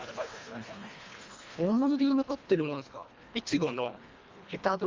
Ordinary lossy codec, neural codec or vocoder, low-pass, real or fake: Opus, 32 kbps; codec, 24 kHz, 1.5 kbps, HILCodec; 7.2 kHz; fake